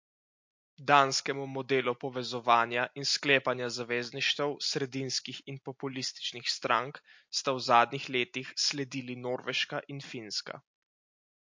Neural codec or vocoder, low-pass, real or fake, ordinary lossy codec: none; 7.2 kHz; real; MP3, 48 kbps